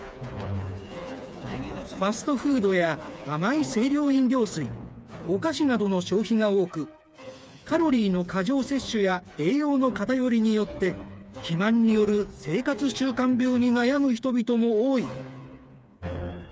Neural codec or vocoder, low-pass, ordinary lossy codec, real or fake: codec, 16 kHz, 4 kbps, FreqCodec, smaller model; none; none; fake